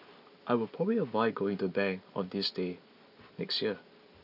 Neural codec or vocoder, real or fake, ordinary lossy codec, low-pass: none; real; none; 5.4 kHz